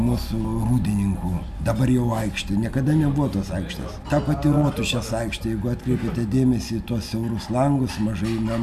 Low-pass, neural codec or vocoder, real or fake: 14.4 kHz; none; real